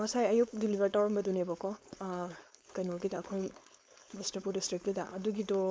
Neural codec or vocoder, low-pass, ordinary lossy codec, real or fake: codec, 16 kHz, 4.8 kbps, FACodec; none; none; fake